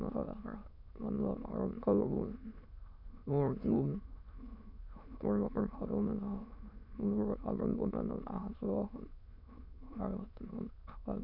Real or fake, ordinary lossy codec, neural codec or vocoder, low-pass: fake; none; autoencoder, 22.05 kHz, a latent of 192 numbers a frame, VITS, trained on many speakers; 5.4 kHz